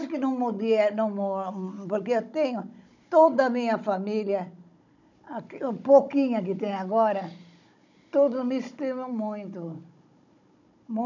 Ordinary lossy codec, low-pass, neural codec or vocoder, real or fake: none; 7.2 kHz; codec, 16 kHz, 16 kbps, FunCodec, trained on Chinese and English, 50 frames a second; fake